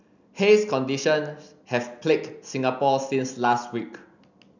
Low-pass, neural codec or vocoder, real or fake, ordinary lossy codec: 7.2 kHz; none; real; none